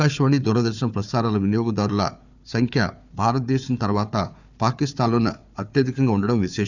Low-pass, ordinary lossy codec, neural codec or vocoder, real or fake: 7.2 kHz; none; codec, 16 kHz, 4 kbps, FunCodec, trained on Chinese and English, 50 frames a second; fake